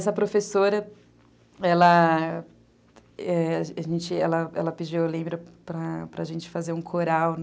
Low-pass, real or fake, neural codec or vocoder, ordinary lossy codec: none; real; none; none